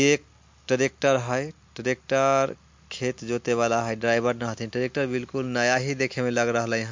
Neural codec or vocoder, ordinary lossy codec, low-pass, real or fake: none; MP3, 48 kbps; 7.2 kHz; real